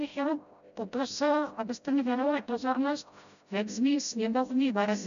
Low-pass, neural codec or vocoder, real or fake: 7.2 kHz; codec, 16 kHz, 0.5 kbps, FreqCodec, smaller model; fake